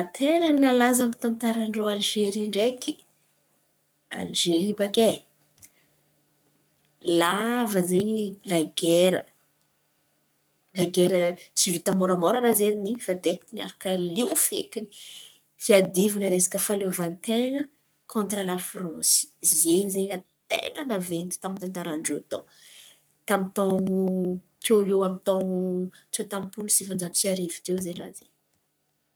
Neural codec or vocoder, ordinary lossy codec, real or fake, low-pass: codec, 44.1 kHz, 3.4 kbps, Pupu-Codec; none; fake; none